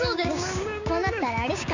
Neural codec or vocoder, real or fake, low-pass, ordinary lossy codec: codec, 16 kHz, 6 kbps, DAC; fake; 7.2 kHz; none